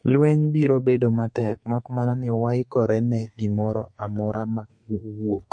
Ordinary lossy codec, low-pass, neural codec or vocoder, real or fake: MP3, 48 kbps; 9.9 kHz; codec, 32 kHz, 1.9 kbps, SNAC; fake